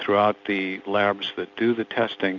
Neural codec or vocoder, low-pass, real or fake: none; 7.2 kHz; real